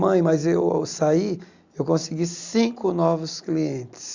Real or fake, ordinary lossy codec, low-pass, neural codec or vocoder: real; Opus, 64 kbps; 7.2 kHz; none